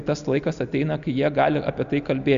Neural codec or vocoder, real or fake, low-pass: none; real; 7.2 kHz